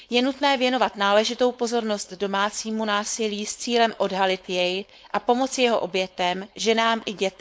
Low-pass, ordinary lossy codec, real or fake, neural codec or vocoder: none; none; fake; codec, 16 kHz, 4.8 kbps, FACodec